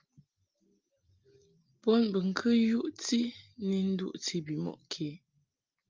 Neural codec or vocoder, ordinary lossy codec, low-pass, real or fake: none; Opus, 24 kbps; 7.2 kHz; real